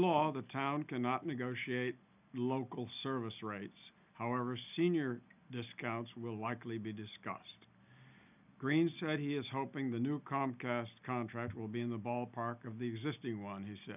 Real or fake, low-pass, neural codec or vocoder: real; 3.6 kHz; none